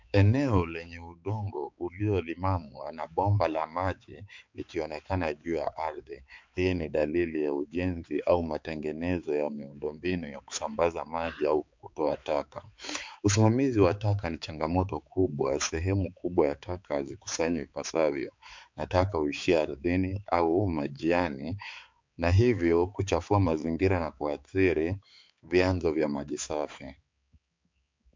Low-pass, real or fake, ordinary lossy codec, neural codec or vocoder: 7.2 kHz; fake; MP3, 64 kbps; codec, 16 kHz, 4 kbps, X-Codec, HuBERT features, trained on balanced general audio